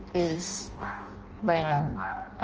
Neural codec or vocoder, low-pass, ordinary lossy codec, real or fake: codec, 16 kHz in and 24 kHz out, 0.6 kbps, FireRedTTS-2 codec; 7.2 kHz; Opus, 24 kbps; fake